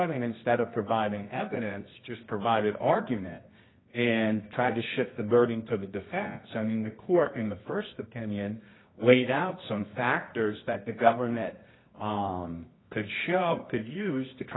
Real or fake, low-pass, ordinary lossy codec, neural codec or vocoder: fake; 7.2 kHz; AAC, 16 kbps; codec, 24 kHz, 0.9 kbps, WavTokenizer, medium music audio release